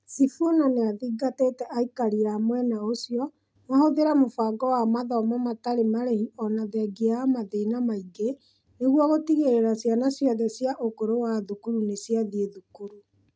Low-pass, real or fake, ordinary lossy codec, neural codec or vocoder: none; real; none; none